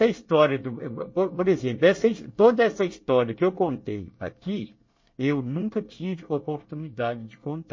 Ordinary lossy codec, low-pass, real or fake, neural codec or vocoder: MP3, 32 kbps; 7.2 kHz; fake; codec, 24 kHz, 1 kbps, SNAC